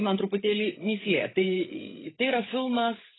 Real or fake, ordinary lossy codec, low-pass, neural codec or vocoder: fake; AAC, 16 kbps; 7.2 kHz; codec, 16 kHz, 8 kbps, FreqCodec, larger model